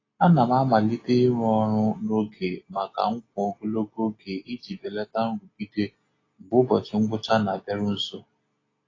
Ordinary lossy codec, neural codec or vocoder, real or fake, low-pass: AAC, 32 kbps; none; real; 7.2 kHz